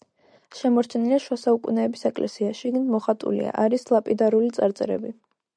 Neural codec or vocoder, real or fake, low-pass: none; real; 9.9 kHz